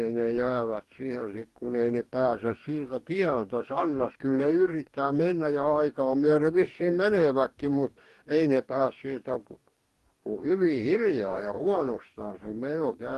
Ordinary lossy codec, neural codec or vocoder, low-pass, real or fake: Opus, 24 kbps; codec, 44.1 kHz, 2.6 kbps, DAC; 14.4 kHz; fake